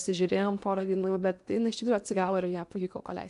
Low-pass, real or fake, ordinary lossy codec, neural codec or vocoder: 10.8 kHz; fake; AAC, 96 kbps; codec, 16 kHz in and 24 kHz out, 0.8 kbps, FocalCodec, streaming, 65536 codes